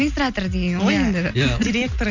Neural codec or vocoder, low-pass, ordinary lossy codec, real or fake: none; 7.2 kHz; none; real